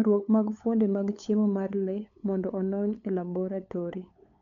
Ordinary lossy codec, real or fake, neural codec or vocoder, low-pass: none; fake; codec, 16 kHz, 8 kbps, FunCodec, trained on LibriTTS, 25 frames a second; 7.2 kHz